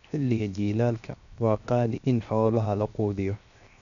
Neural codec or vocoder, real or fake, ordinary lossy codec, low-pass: codec, 16 kHz, 0.7 kbps, FocalCodec; fake; none; 7.2 kHz